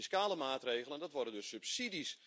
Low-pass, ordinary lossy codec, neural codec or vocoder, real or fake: none; none; none; real